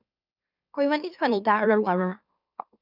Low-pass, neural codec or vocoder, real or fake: 5.4 kHz; autoencoder, 44.1 kHz, a latent of 192 numbers a frame, MeloTTS; fake